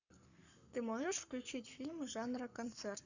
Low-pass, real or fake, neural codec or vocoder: 7.2 kHz; fake; codec, 44.1 kHz, 7.8 kbps, Pupu-Codec